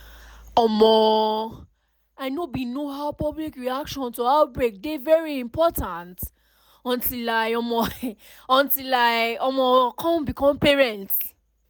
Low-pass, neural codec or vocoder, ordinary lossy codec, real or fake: none; none; none; real